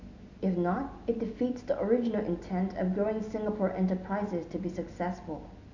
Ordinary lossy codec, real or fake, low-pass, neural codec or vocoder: MP3, 64 kbps; real; 7.2 kHz; none